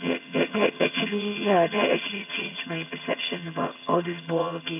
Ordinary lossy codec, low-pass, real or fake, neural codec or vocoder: none; 3.6 kHz; fake; vocoder, 22.05 kHz, 80 mel bands, HiFi-GAN